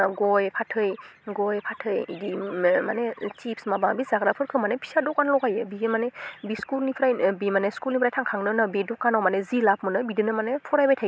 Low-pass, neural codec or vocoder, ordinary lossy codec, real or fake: none; none; none; real